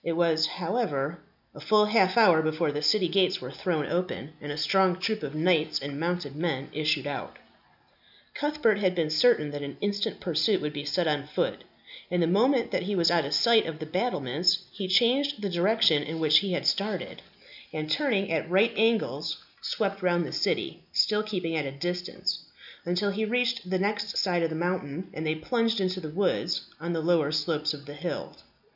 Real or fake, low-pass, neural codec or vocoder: real; 5.4 kHz; none